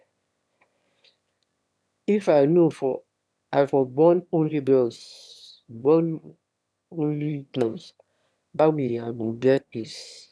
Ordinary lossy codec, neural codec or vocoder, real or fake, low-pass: none; autoencoder, 22.05 kHz, a latent of 192 numbers a frame, VITS, trained on one speaker; fake; none